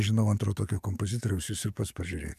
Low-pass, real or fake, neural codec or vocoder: 14.4 kHz; fake; codec, 44.1 kHz, 7.8 kbps, DAC